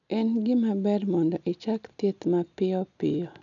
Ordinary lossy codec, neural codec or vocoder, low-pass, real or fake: none; none; 7.2 kHz; real